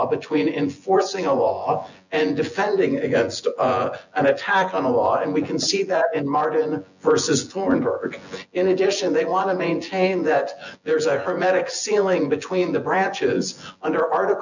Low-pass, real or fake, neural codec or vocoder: 7.2 kHz; fake; vocoder, 24 kHz, 100 mel bands, Vocos